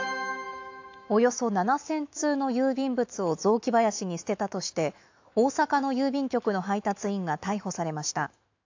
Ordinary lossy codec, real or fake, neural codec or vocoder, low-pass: AAC, 48 kbps; fake; autoencoder, 48 kHz, 128 numbers a frame, DAC-VAE, trained on Japanese speech; 7.2 kHz